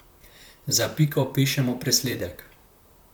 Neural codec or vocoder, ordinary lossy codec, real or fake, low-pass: vocoder, 44.1 kHz, 128 mel bands, Pupu-Vocoder; none; fake; none